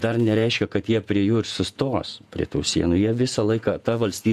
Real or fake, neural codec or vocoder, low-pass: fake; vocoder, 48 kHz, 128 mel bands, Vocos; 14.4 kHz